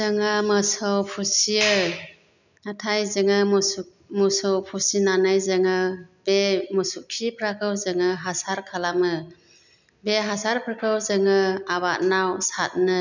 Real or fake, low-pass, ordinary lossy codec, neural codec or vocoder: real; 7.2 kHz; none; none